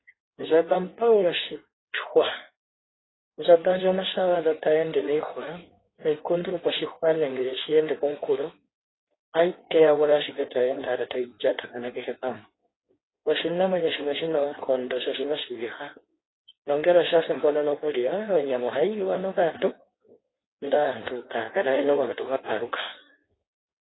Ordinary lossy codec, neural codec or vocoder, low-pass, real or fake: AAC, 16 kbps; codec, 16 kHz in and 24 kHz out, 1.1 kbps, FireRedTTS-2 codec; 7.2 kHz; fake